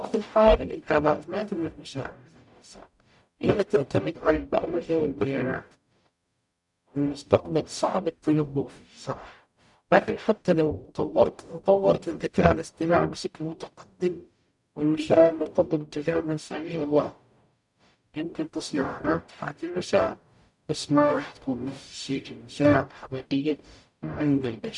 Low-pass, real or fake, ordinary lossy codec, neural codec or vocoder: 10.8 kHz; fake; none; codec, 44.1 kHz, 0.9 kbps, DAC